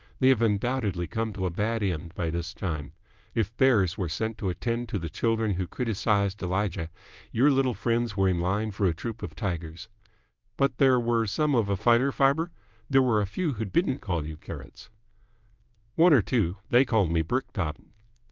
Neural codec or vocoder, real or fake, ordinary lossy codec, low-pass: codec, 24 kHz, 0.9 kbps, WavTokenizer, medium speech release version 1; fake; Opus, 32 kbps; 7.2 kHz